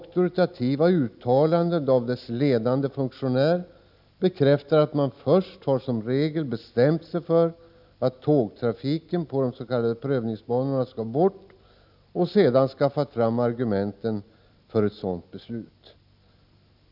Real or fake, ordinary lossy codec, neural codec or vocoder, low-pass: real; none; none; 5.4 kHz